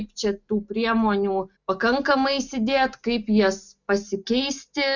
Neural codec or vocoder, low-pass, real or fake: none; 7.2 kHz; real